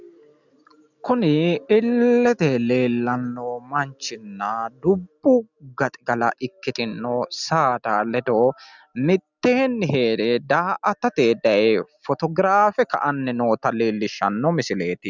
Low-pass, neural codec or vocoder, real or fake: 7.2 kHz; none; real